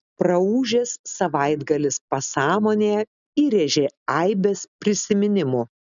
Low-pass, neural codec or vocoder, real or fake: 7.2 kHz; none; real